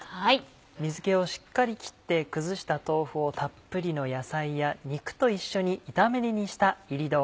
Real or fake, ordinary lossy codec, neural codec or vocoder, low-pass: real; none; none; none